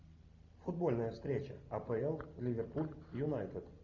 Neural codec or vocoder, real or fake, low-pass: none; real; 7.2 kHz